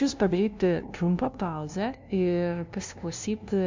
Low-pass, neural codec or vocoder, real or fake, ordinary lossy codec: 7.2 kHz; codec, 16 kHz, 0.5 kbps, FunCodec, trained on LibriTTS, 25 frames a second; fake; MP3, 64 kbps